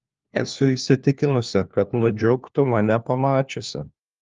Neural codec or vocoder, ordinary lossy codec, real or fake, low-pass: codec, 16 kHz, 1 kbps, FunCodec, trained on LibriTTS, 50 frames a second; Opus, 32 kbps; fake; 7.2 kHz